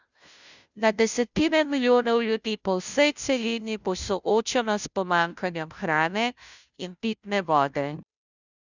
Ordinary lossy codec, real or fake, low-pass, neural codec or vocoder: none; fake; 7.2 kHz; codec, 16 kHz, 0.5 kbps, FunCodec, trained on Chinese and English, 25 frames a second